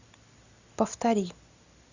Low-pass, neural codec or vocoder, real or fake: 7.2 kHz; none; real